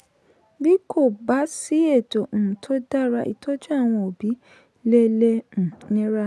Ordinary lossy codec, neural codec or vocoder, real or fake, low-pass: none; none; real; none